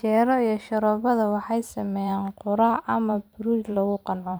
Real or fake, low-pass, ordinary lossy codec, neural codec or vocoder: fake; none; none; vocoder, 44.1 kHz, 128 mel bands every 512 samples, BigVGAN v2